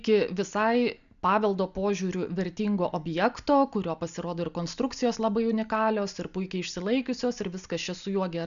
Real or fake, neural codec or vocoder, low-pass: real; none; 7.2 kHz